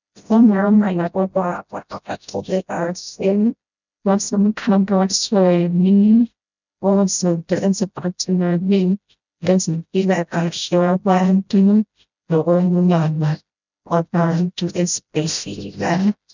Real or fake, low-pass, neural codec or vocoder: fake; 7.2 kHz; codec, 16 kHz, 0.5 kbps, FreqCodec, smaller model